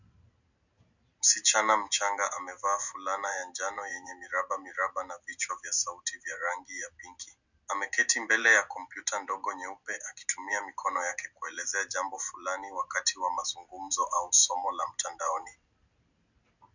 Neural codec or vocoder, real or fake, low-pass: none; real; 7.2 kHz